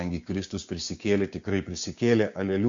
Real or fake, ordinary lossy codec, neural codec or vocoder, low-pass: fake; Opus, 64 kbps; codec, 16 kHz, 6 kbps, DAC; 7.2 kHz